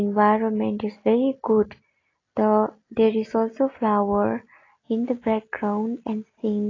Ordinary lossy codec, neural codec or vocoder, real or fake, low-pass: AAC, 32 kbps; none; real; 7.2 kHz